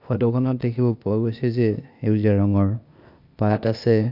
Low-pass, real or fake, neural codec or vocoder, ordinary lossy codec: 5.4 kHz; fake; codec, 16 kHz, 0.8 kbps, ZipCodec; none